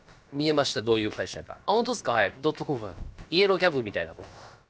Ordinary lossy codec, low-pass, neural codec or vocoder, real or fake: none; none; codec, 16 kHz, about 1 kbps, DyCAST, with the encoder's durations; fake